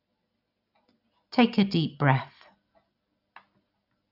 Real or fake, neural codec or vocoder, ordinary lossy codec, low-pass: real; none; none; 5.4 kHz